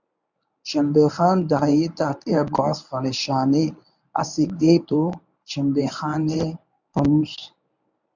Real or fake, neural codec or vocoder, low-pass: fake; codec, 24 kHz, 0.9 kbps, WavTokenizer, medium speech release version 1; 7.2 kHz